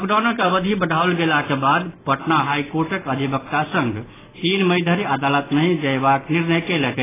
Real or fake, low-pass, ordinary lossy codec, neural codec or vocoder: real; 3.6 kHz; AAC, 16 kbps; none